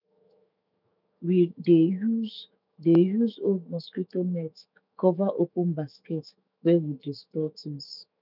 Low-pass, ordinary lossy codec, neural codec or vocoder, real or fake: 5.4 kHz; none; autoencoder, 48 kHz, 128 numbers a frame, DAC-VAE, trained on Japanese speech; fake